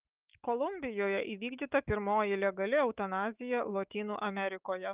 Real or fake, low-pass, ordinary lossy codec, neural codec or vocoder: fake; 3.6 kHz; Opus, 32 kbps; autoencoder, 48 kHz, 128 numbers a frame, DAC-VAE, trained on Japanese speech